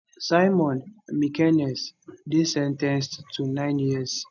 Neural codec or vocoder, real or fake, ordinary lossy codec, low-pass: none; real; none; 7.2 kHz